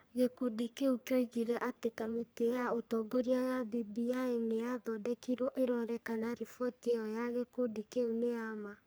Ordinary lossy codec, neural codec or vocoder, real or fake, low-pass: none; codec, 44.1 kHz, 3.4 kbps, Pupu-Codec; fake; none